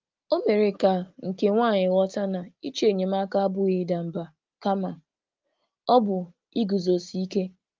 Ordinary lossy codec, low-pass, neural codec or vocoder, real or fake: Opus, 32 kbps; 7.2 kHz; none; real